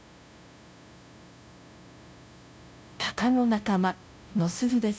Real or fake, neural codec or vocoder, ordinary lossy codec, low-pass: fake; codec, 16 kHz, 0.5 kbps, FunCodec, trained on LibriTTS, 25 frames a second; none; none